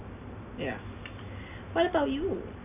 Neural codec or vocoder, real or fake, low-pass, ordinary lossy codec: none; real; 3.6 kHz; none